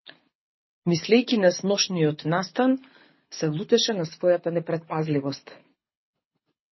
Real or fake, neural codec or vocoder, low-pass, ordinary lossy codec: fake; codec, 24 kHz, 6 kbps, HILCodec; 7.2 kHz; MP3, 24 kbps